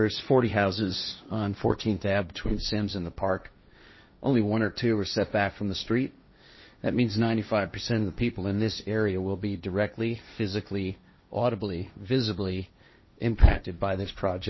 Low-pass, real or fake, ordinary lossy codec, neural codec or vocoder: 7.2 kHz; fake; MP3, 24 kbps; codec, 16 kHz, 1.1 kbps, Voila-Tokenizer